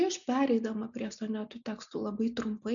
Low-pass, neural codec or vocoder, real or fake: 7.2 kHz; none; real